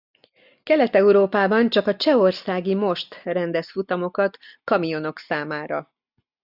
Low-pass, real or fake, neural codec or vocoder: 5.4 kHz; real; none